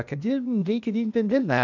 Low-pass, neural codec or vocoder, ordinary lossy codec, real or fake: 7.2 kHz; codec, 16 kHz, 0.8 kbps, ZipCodec; none; fake